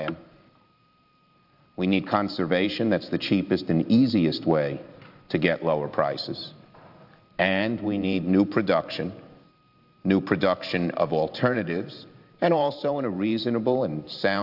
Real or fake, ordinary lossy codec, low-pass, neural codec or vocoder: fake; AAC, 48 kbps; 5.4 kHz; vocoder, 44.1 kHz, 128 mel bands every 512 samples, BigVGAN v2